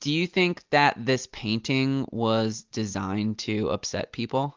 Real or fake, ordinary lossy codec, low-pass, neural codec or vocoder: real; Opus, 32 kbps; 7.2 kHz; none